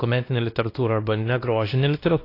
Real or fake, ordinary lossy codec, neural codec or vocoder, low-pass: fake; AAC, 32 kbps; codec, 16 kHz, 1 kbps, X-Codec, WavLM features, trained on Multilingual LibriSpeech; 5.4 kHz